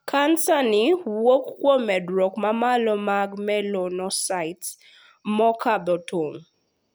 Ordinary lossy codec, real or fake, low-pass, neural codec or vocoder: none; real; none; none